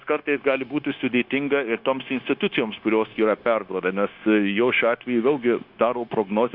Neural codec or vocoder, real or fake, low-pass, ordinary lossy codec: codec, 16 kHz, 0.9 kbps, LongCat-Audio-Codec; fake; 5.4 kHz; Opus, 64 kbps